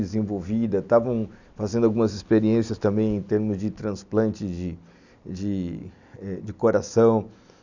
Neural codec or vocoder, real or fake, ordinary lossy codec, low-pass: none; real; none; 7.2 kHz